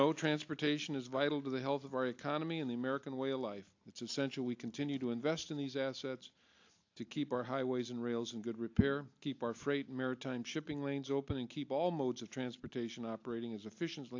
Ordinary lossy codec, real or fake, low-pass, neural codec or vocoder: AAC, 48 kbps; real; 7.2 kHz; none